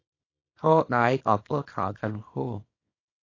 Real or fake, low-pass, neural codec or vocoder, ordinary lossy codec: fake; 7.2 kHz; codec, 24 kHz, 0.9 kbps, WavTokenizer, small release; AAC, 32 kbps